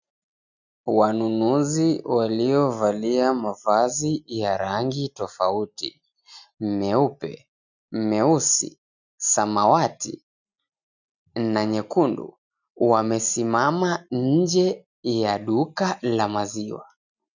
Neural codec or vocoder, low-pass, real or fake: none; 7.2 kHz; real